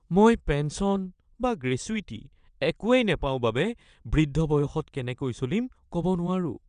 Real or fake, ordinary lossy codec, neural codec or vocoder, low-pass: fake; none; vocoder, 22.05 kHz, 80 mel bands, WaveNeXt; 9.9 kHz